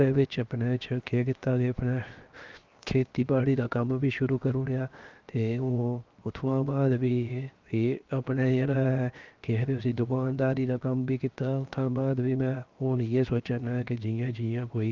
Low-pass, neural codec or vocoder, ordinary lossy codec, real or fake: 7.2 kHz; codec, 16 kHz, 0.7 kbps, FocalCodec; Opus, 32 kbps; fake